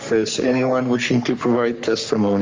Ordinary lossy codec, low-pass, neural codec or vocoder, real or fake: Opus, 32 kbps; 7.2 kHz; codec, 44.1 kHz, 3.4 kbps, Pupu-Codec; fake